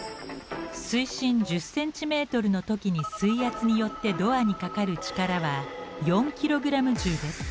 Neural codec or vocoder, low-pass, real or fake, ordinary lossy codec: none; none; real; none